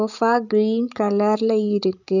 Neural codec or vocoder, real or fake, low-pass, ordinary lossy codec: codec, 16 kHz, 16 kbps, FreqCodec, larger model; fake; 7.2 kHz; none